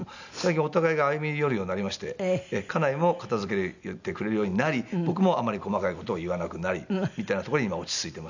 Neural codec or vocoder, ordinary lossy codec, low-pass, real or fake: none; none; 7.2 kHz; real